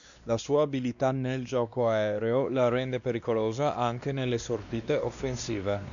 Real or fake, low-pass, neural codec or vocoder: fake; 7.2 kHz; codec, 16 kHz, 2 kbps, X-Codec, WavLM features, trained on Multilingual LibriSpeech